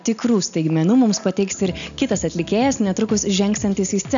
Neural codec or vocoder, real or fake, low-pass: none; real; 7.2 kHz